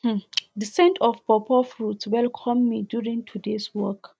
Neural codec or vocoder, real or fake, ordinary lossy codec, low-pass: none; real; none; none